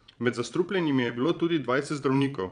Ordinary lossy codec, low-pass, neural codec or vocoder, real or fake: none; 9.9 kHz; vocoder, 22.05 kHz, 80 mel bands, Vocos; fake